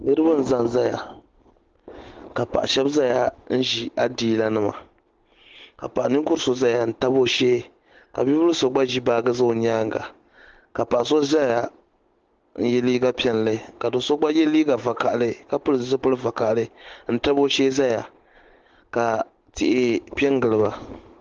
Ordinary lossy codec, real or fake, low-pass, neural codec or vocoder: Opus, 16 kbps; real; 7.2 kHz; none